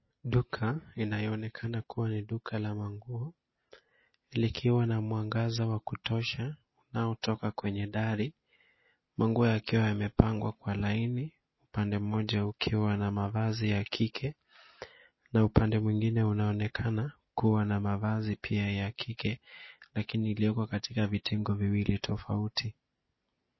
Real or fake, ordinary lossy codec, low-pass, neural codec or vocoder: real; MP3, 24 kbps; 7.2 kHz; none